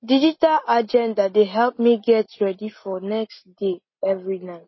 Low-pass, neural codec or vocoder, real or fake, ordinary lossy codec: 7.2 kHz; none; real; MP3, 24 kbps